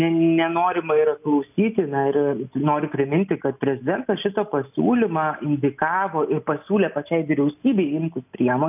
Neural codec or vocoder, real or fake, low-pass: none; real; 3.6 kHz